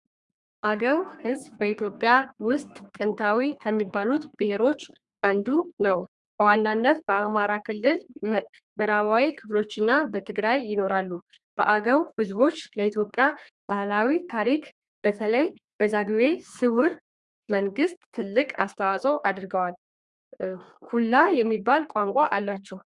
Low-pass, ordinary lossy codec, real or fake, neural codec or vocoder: 10.8 kHz; Opus, 64 kbps; fake; codec, 32 kHz, 1.9 kbps, SNAC